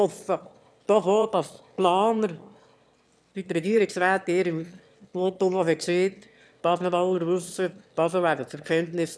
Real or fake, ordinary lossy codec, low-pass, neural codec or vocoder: fake; none; none; autoencoder, 22.05 kHz, a latent of 192 numbers a frame, VITS, trained on one speaker